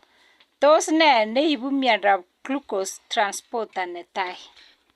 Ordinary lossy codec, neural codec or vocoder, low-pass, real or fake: Opus, 64 kbps; none; 14.4 kHz; real